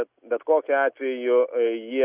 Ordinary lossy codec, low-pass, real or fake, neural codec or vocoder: Opus, 64 kbps; 3.6 kHz; real; none